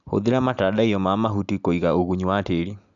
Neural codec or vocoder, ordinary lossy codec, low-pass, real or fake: none; none; 7.2 kHz; real